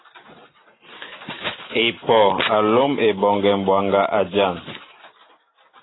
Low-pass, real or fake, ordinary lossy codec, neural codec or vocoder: 7.2 kHz; real; AAC, 16 kbps; none